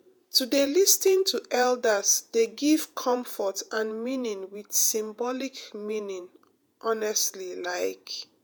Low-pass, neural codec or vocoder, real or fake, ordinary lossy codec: none; vocoder, 48 kHz, 128 mel bands, Vocos; fake; none